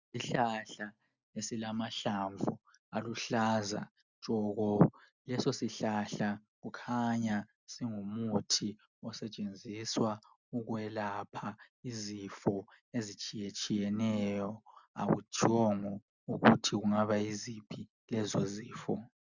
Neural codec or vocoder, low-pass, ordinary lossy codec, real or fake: none; 7.2 kHz; Opus, 64 kbps; real